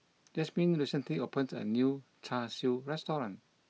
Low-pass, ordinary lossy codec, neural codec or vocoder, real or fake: none; none; none; real